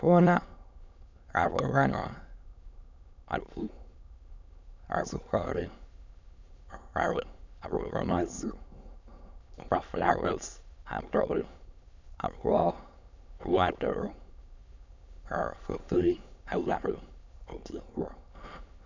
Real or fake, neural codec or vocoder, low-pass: fake; autoencoder, 22.05 kHz, a latent of 192 numbers a frame, VITS, trained on many speakers; 7.2 kHz